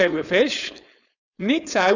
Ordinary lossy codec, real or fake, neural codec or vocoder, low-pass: none; fake; codec, 16 kHz, 4.8 kbps, FACodec; 7.2 kHz